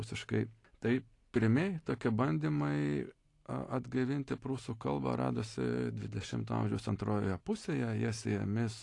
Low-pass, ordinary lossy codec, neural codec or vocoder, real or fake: 10.8 kHz; AAC, 48 kbps; none; real